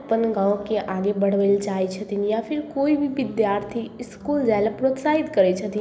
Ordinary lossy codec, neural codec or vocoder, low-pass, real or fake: none; none; none; real